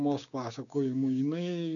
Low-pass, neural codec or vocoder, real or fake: 7.2 kHz; codec, 16 kHz, 6 kbps, DAC; fake